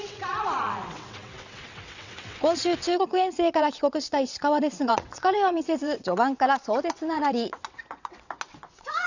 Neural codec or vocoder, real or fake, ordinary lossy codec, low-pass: vocoder, 22.05 kHz, 80 mel bands, WaveNeXt; fake; none; 7.2 kHz